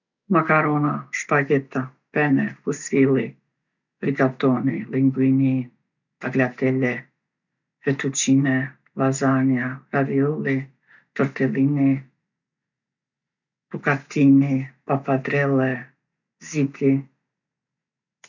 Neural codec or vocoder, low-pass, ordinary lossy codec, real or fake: none; 7.2 kHz; none; real